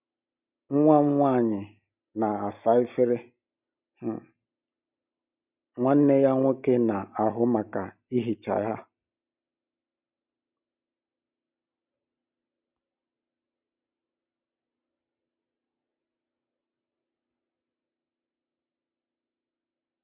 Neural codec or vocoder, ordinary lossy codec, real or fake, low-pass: none; none; real; 3.6 kHz